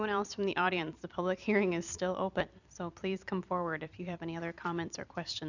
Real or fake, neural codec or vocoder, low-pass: fake; vocoder, 44.1 kHz, 128 mel bands every 256 samples, BigVGAN v2; 7.2 kHz